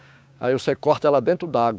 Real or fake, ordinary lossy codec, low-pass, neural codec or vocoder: fake; none; none; codec, 16 kHz, 6 kbps, DAC